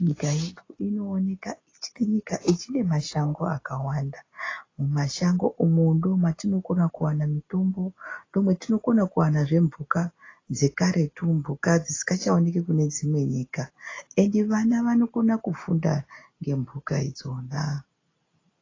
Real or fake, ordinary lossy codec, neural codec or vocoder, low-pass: real; AAC, 32 kbps; none; 7.2 kHz